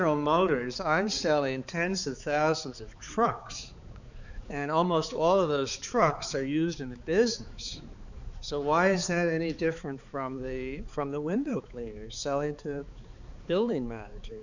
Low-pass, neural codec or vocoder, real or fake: 7.2 kHz; codec, 16 kHz, 4 kbps, X-Codec, HuBERT features, trained on balanced general audio; fake